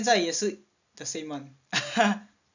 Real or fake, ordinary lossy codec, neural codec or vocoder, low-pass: real; none; none; 7.2 kHz